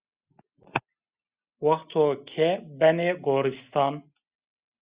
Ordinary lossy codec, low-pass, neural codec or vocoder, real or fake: Opus, 64 kbps; 3.6 kHz; none; real